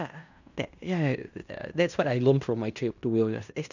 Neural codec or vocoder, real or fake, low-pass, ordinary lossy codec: codec, 16 kHz in and 24 kHz out, 0.9 kbps, LongCat-Audio-Codec, fine tuned four codebook decoder; fake; 7.2 kHz; none